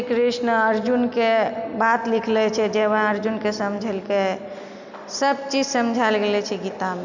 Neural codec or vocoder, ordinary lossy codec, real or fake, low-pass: none; none; real; 7.2 kHz